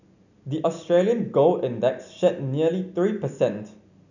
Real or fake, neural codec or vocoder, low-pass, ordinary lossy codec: real; none; 7.2 kHz; none